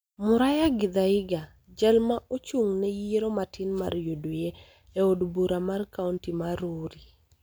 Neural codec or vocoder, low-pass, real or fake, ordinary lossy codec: none; none; real; none